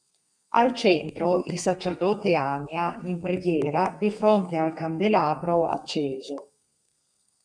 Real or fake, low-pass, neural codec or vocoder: fake; 9.9 kHz; codec, 32 kHz, 1.9 kbps, SNAC